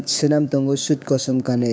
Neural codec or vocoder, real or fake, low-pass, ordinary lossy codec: codec, 16 kHz, 6 kbps, DAC; fake; none; none